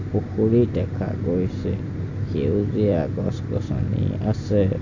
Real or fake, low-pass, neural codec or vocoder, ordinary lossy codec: real; 7.2 kHz; none; AAC, 48 kbps